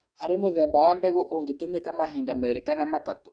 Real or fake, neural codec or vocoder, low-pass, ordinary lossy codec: fake; codec, 44.1 kHz, 2.6 kbps, DAC; 9.9 kHz; none